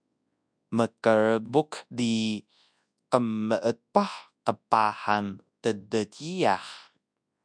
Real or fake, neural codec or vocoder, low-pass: fake; codec, 24 kHz, 0.9 kbps, WavTokenizer, large speech release; 9.9 kHz